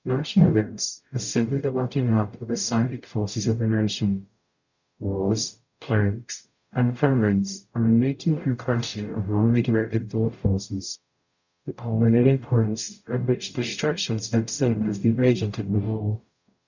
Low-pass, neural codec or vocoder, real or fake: 7.2 kHz; codec, 44.1 kHz, 0.9 kbps, DAC; fake